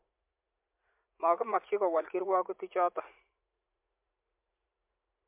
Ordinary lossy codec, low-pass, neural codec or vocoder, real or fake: MP3, 32 kbps; 3.6 kHz; vocoder, 44.1 kHz, 80 mel bands, Vocos; fake